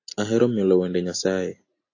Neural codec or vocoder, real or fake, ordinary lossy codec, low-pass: none; real; AAC, 32 kbps; 7.2 kHz